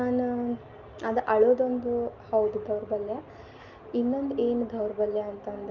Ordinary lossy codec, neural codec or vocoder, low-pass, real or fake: Opus, 24 kbps; none; 7.2 kHz; real